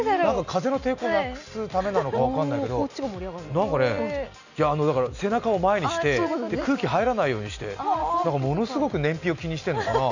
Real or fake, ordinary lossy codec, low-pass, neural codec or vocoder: real; none; 7.2 kHz; none